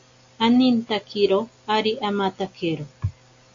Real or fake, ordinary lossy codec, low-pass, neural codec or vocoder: real; AAC, 48 kbps; 7.2 kHz; none